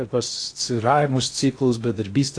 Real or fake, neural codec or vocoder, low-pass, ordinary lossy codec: fake; codec, 16 kHz in and 24 kHz out, 0.6 kbps, FocalCodec, streaming, 2048 codes; 9.9 kHz; AAC, 48 kbps